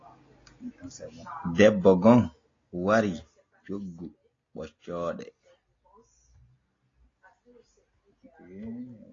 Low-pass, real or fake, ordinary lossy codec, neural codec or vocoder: 7.2 kHz; real; AAC, 32 kbps; none